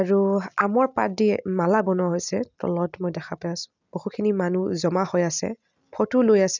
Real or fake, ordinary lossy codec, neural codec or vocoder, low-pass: real; none; none; 7.2 kHz